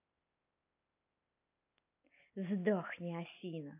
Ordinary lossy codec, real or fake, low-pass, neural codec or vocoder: AAC, 24 kbps; real; 3.6 kHz; none